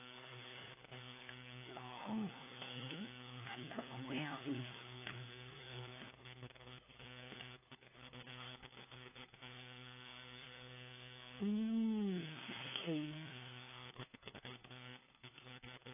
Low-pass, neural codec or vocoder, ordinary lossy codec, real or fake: 3.6 kHz; codec, 16 kHz, 4 kbps, FunCodec, trained on LibriTTS, 50 frames a second; AAC, 24 kbps; fake